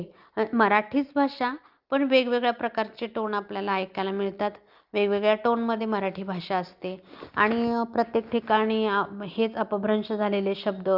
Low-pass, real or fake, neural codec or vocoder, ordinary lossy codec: 5.4 kHz; real; none; Opus, 24 kbps